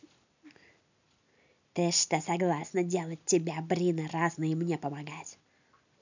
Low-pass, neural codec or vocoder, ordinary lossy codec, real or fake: 7.2 kHz; none; none; real